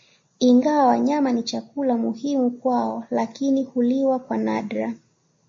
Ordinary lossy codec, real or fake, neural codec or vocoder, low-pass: MP3, 32 kbps; real; none; 7.2 kHz